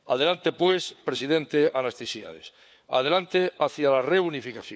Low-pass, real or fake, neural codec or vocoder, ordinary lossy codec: none; fake; codec, 16 kHz, 4 kbps, FunCodec, trained on LibriTTS, 50 frames a second; none